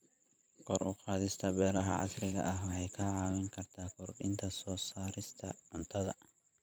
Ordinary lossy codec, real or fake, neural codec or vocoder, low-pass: none; fake; vocoder, 44.1 kHz, 128 mel bands every 512 samples, BigVGAN v2; none